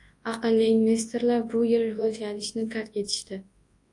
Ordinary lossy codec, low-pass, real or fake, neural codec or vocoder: AAC, 48 kbps; 10.8 kHz; fake; codec, 24 kHz, 0.9 kbps, WavTokenizer, large speech release